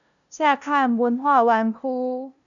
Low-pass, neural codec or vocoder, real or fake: 7.2 kHz; codec, 16 kHz, 0.5 kbps, FunCodec, trained on LibriTTS, 25 frames a second; fake